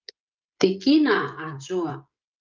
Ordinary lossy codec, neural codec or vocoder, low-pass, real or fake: Opus, 24 kbps; codec, 16 kHz, 16 kbps, FreqCodec, smaller model; 7.2 kHz; fake